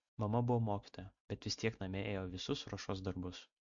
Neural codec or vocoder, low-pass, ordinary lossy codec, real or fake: none; 7.2 kHz; MP3, 48 kbps; real